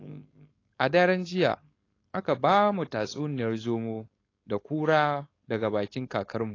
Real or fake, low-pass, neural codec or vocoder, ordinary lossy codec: fake; 7.2 kHz; codec, 16 kHz, 4.8 kbps, FACodec; AAC, 32 kbps